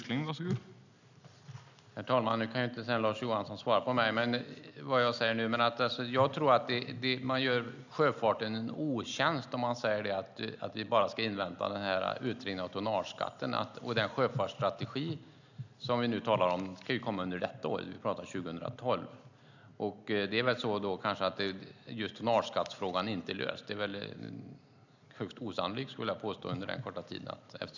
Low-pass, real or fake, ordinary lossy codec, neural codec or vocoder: 7.2 kHz; real; none; none